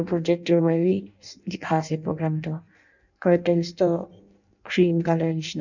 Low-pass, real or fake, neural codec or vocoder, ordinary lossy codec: 7.2 kHz; fake; codec, 16 kHz in and 24 kHz out, 0.6 kbps, FireRedTTS-2 codec; none